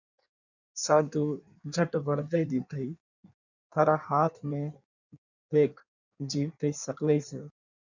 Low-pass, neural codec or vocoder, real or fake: 7.2 kHz; codec, 16 kHz in and 24 kHz out, 1.1 kbps, FireRedTTS-2 codec; fake